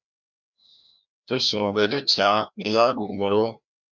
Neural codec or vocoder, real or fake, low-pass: codec, 16 kHz, 1 kbps, FreqCodec, larger model; fake; 7.2 kHz